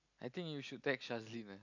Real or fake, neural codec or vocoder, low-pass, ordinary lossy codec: real; none; 7.2 kHz; none